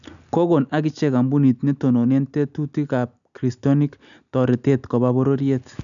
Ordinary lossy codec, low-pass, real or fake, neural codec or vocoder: none; 7.2 kHz; real; none